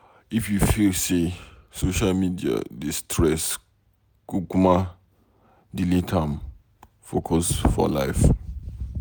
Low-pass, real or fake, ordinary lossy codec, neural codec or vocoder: none; real; none; none